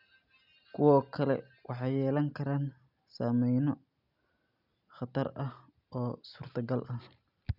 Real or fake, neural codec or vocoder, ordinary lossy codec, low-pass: real; none; none; 5.4 kHz